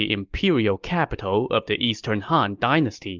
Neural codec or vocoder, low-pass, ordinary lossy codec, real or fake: none; 7.2 kHz; Opus, 24 kbps; real